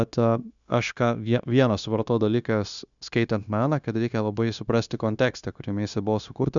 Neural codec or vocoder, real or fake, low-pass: codec, 16 kHz, 0.9 kbps, LongCat-Audio-Codec; fake; 7.2 kHz